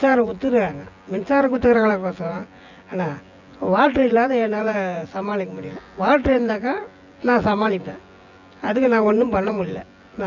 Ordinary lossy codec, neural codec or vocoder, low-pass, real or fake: none; vocoder, 24 kHz, 100 mel bands, Vocos; 7.2 kHz; fake